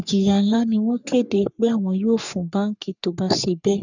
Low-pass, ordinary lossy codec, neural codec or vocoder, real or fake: 7.2 kHz; none; codec, 44.1 kHz, 3.4 kbps, Pupu-Codec; fake